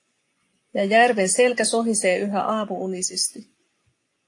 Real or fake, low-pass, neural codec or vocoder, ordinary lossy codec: fake; 10.8 kHz; vocoder, 24 kHz, 100 mel bands, Vocos; AAC, 48 kbps